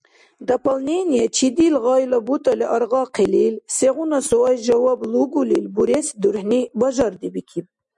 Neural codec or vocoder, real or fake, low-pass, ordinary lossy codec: none; real; 10.8 kHz; MP3, 96 kbps